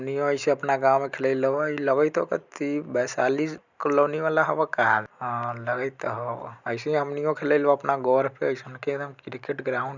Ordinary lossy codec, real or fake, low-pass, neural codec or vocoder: none; real; 7.2 kHz; none